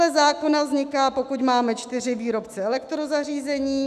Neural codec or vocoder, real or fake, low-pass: autoencoder, 48 kHz, 128 numbers a frame, DAC-VAE, trained on Japanese speech; fake; 14.4 kHz